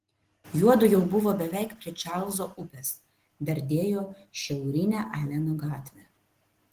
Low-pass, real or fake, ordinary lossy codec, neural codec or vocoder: 14.4 kHz; real; Opus, 16 kbps; none